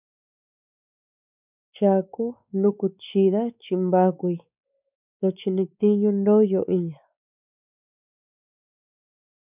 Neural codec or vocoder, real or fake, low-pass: codec, 16 kHz, 4 kbps, X-Codec, WavLM features, trained on Multilingual LibriSpeech; fake; 3.6 kHz